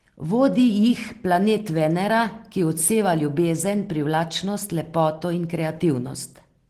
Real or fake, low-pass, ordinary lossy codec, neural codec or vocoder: real; 14.4 kHz; Opus, 16 kbps; none